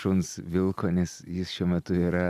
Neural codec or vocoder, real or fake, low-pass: none; real; 14.4 kHz